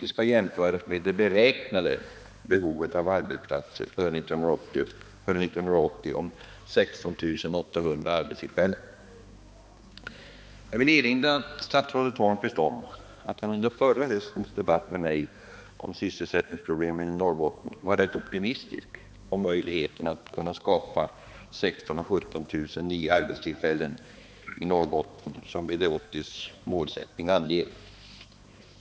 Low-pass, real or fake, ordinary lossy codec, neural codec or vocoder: none; fake; none; codec, 16 kHz, 2 kbps, X-Codec, HuBERT features, trained on balanced general audio